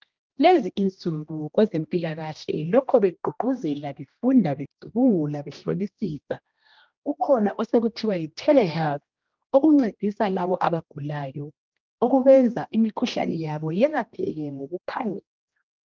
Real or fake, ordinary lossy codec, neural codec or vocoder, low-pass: fake; Opus, 24 kbps; codec, 16 kHz, 1 kbps, X-Codec, HuBERT features, trained on general audio; 7.2 kHz